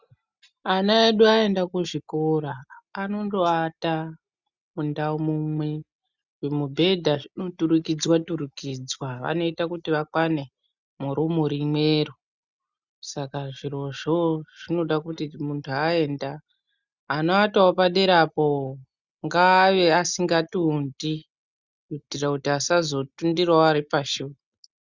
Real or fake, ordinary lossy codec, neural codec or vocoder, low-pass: real; Opus, 64 kbps; none; 7.2 kHz